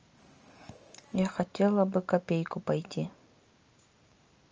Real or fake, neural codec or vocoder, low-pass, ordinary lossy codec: real; none; 7.2 kHz; Opus, 24 kbps